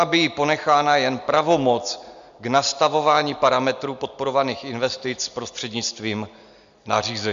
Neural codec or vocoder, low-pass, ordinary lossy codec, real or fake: none; 7.2 kHz; MP3, 64 kbps; real